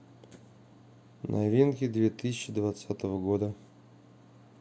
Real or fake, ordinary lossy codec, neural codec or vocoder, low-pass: real; none; none; none